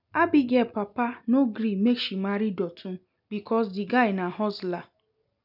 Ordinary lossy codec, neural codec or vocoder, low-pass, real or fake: none; none; 5.4 kHz; real